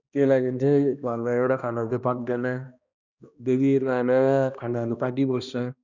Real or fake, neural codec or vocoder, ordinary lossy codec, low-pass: fake; codec, 16 kHz, 1 kbps, X-Codec, HuBERT features, trained on balanced general audio; none; 7.2 kHz